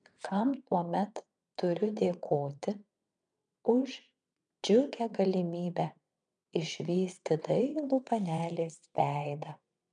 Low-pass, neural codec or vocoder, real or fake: 9.9 kHz; vocoder, 22.05 kHz, 80 mel bands, WaveNeXt; fake